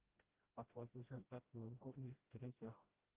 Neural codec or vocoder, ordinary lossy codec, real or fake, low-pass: codec, 16 kHz, 0.5 kbps, FreqCodec, smaller model; Opus, 16 kbps; fake; 3.6 kHz